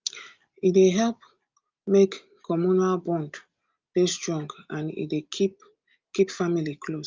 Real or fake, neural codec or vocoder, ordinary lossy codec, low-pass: real; none; Opus, 24 kbps; 7.2 kHz